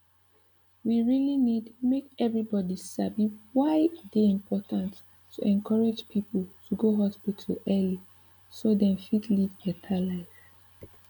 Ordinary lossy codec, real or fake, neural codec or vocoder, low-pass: none; real; none; 19.8 kHz